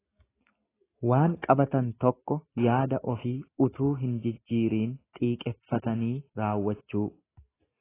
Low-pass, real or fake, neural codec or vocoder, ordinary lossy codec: 3.6 kHz; real; none; AAC, 16 kbps